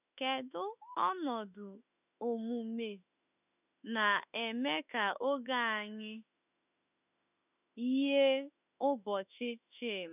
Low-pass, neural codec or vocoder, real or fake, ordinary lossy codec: 3.6 kHz; autoencoder, 48 kHz, 32 numbers a frame, DAC-VAE, trained on Japanese speech; fake; none